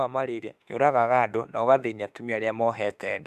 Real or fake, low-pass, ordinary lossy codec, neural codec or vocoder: fake; 14.4 kHz; none; autoencoder, 48 kHz, 32 numbers a frame, DAC-VAE, trained on Japanese speech